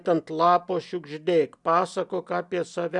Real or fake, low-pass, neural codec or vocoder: real; 10.8 kHz; none